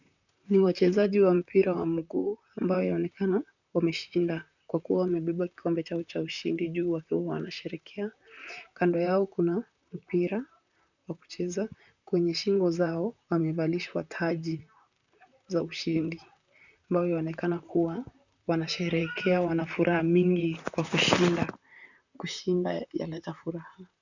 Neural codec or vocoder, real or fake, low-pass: vocoder, 44.1 kHz, 128 mel bands, Pupu-Vocoder; fake; 7.2 kHz